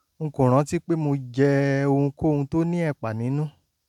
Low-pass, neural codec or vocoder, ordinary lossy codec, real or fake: 19.8 kHz; none; none; real